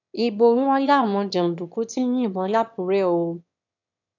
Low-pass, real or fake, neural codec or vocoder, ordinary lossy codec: 7.2 kHz; fake; autoencoder, 22.05 kHz, a latent of 192 numbers a frame, VITS, trained on one speaker; none